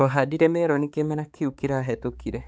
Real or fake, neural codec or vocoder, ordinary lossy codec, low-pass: fake; codec, 16 kHz, 4 kbps, X-Codec, HuBERT features, trained on balanced general audio; none; none